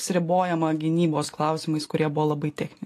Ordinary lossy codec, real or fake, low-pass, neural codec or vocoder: AAC, 48 kbps; real; 14.4 kHz; none